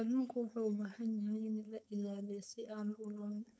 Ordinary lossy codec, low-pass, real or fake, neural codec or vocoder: none; none; fake; codec, 16 kHz, 4.8 kbps, FACodec